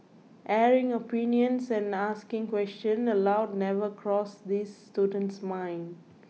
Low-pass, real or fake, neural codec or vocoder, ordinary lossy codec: none; real; none; none